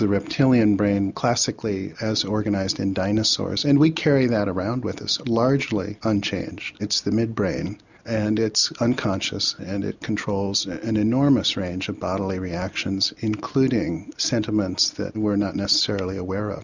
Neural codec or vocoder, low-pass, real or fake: none; 7.2 kHz; real